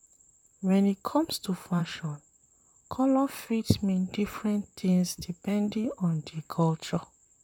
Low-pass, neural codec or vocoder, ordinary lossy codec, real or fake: 19.8 kHz; vocoder, 44.1 kHz, 128 mel bands, Pupu-Vocoder; none; fake